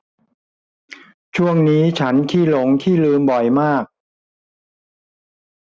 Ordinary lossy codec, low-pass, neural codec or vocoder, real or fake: none; none; none; real